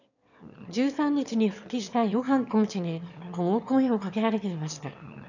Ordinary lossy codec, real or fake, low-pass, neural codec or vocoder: none; fake; 7.2 kHz; autoencoder, 22.05 kHz, a latent of 192 numbers a frame, VITS, trained on one speaker